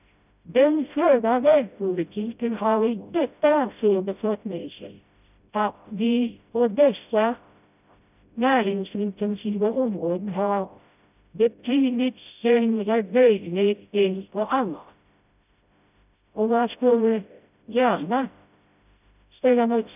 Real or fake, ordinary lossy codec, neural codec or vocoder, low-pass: fake; none; codec, 16 kHz, 0.5 kbps, FreqCodec, smaller model; 3.6 kHz